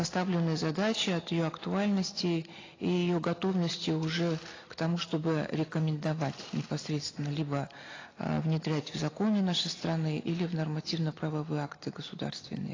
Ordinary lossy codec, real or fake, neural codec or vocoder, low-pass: AAC, 32 kbps; real; none; 7.2 kHz